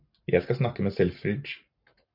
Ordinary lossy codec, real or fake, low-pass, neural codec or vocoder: MP3, 48 kbps; fake; 5.4 kHz; vocoder, 44.1 kHz, 128 mel bands every 512 samples, BigVGAN v2